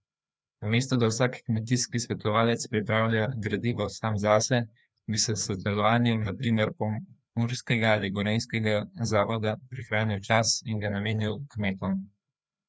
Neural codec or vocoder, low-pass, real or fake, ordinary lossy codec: codec, 16 kHz, 2 kbps, FreqCodec, larger model; none; fake; none